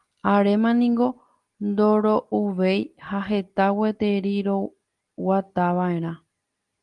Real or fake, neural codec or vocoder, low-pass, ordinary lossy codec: real; none; 10.8 kHz; Opus, 24 kbps